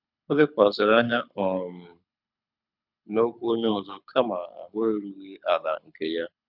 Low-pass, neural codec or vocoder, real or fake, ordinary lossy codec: 5.4 kHz; codec, 24 kHz, 6 kbps, HILCodec; fake; none